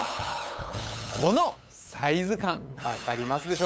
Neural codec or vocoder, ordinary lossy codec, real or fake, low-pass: codec, 16 kHz, 8 kbps, FunCodec, trained on LibriTTS, 25 frames a second; none; fake; none